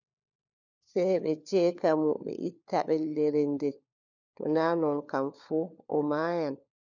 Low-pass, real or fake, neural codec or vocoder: 7.2 kHz; fake; codec, 16 kHz, 4 kbps, FunCodec, trained on LibriTTS, 50 frames a second